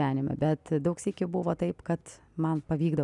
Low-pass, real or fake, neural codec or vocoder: 10.8 kHz; real; none